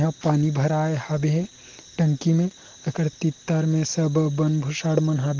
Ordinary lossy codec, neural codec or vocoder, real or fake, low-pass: Opus, 16 kbps; none; real; 7.2 kHz